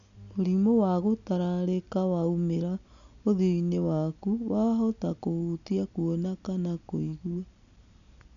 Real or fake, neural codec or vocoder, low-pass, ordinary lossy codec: real; none; 7.2 kHz; none